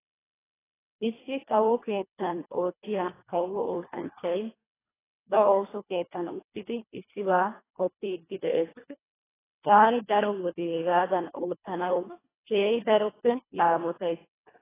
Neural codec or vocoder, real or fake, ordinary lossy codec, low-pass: codec, 24 kHz, 1.5 kbps, HILCodec; fake; AAC, 16 kbps; 3.6 kHz